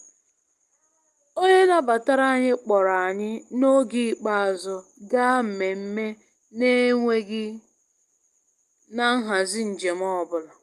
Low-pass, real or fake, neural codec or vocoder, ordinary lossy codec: 14.4 kHz; real; none; Opus, 32 kbps